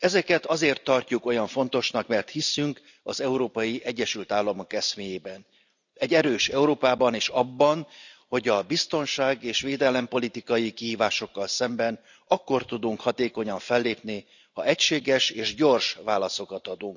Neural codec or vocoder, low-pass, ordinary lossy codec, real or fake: none; 7.2 kHz; none; real